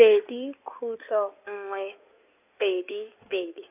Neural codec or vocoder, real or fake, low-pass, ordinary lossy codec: codec, 16 kHz in and 24 kHz out, 2.2 kbps, FireRedTTS-2 codec; fake; 3.6 kHz; none